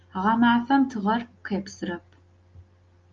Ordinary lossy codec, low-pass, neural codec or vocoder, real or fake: Opus, 32 kbps; 7.2 kHz; none; real